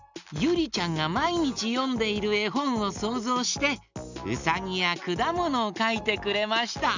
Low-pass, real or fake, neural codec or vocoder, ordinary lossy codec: 7.2 kHz; real; none; none